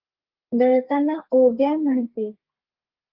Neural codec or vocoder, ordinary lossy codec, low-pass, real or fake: codec, 32 kHz, 1.9 kbps, SNAC; Opus, 32 kbps; 5.4 kHz; fake